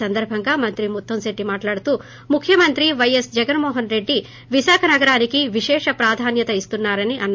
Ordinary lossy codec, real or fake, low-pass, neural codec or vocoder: MP3, 48 kbps; real; 7.2 kHz; none